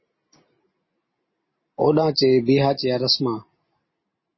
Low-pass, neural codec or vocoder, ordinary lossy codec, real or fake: 7.2 kHz; none; MP3, 24 kbps; real